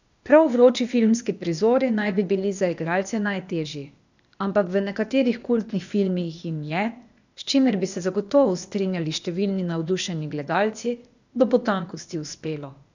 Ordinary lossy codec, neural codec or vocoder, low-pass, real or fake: none; codec, 16 kHz, 0.8 kbps, ZipCodec; 7.2 kHz; fake